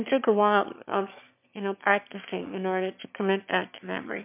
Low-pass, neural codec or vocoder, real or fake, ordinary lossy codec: 3.6 kHz; autoencoder, 22.05 kHz, a latent of 192 numbers a frame, VITS, trained on one speaker; fake; MP3, 32 kbps